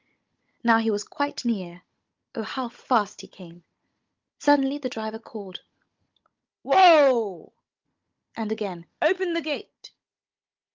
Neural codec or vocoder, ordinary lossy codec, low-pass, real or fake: codec, 16 kHz, 16 kbps, FunCodec, trained on Chinese and English, 50 frames a second; Opus, 24 kbps; 7.2 kHz; fake